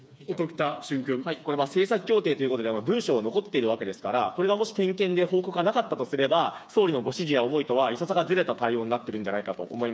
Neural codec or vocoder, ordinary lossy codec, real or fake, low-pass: codec, 16 kHz, 4 kbps, FreqCodec, smaller model; none; fake; none